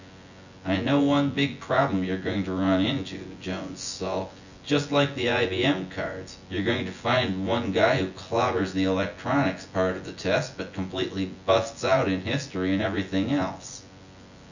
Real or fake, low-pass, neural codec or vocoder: fake; 7.2 kHz; vocoder, 24 kHz, 100 mel bands, Vocos